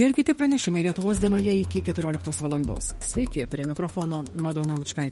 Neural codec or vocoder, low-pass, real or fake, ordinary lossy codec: autoencoder, 48 kHz, 32 numbers a frame, DAC-VAE, trained on Japanese speech; 19.8 kHz; fake; MP3, 48 kbps